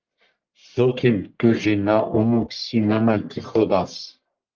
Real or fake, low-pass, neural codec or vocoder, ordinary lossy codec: fake; 7.2 kHz; codec, 44.1 kHz, 1.7 kbps, Pupu-Codec; Opus, 24 kbps